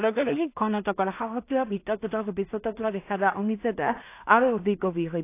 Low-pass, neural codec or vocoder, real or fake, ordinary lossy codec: 3.6 kHz; codec, 16 kHz in and 24 kHz out, 0.4 kbps, LongCat-Audio-Codec, two codebook decoder; fake; AAC, 24 kbps